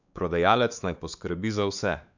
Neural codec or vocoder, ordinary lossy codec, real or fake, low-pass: codec, 16 kHz, 4 kbps, X-Codec, WavLM features, trained on Multilingual LibriSpeech; none; fake; 7.2 kHz